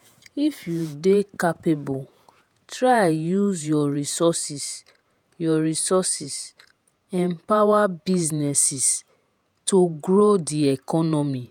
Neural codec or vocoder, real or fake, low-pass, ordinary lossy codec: vocoder, 48 kHz, 128 mel bands, Vocos; fake; 19.8 kHz; none